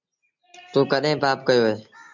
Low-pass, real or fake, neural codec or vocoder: 7.2 kHz; real; none